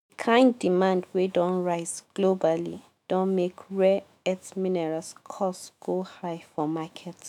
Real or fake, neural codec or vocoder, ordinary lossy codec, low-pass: fake; autoencoder, 48 kHz, 128 numbers a frame, DAC-VAE, trained on Japanese speech; none; 19.8 kHz